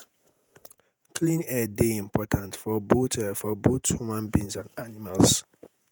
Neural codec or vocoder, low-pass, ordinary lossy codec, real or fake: vocoder, 48 kHz, 128 mel bands, Vocos; none; none; fake